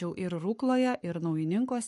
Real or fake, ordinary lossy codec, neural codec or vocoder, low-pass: fake; MP3, 48 kbps; codec, 44.1 kHz, 7.8 kbps, Pupu-Codec; 14.4 kHz